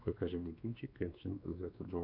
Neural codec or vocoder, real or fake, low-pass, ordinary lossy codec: codec, 16 kHz, 2 kbps, X-Codec, WavLM features, trained on Multilingual LibriSpeech; fake; 5.4 kHz; AAC, 48 kbps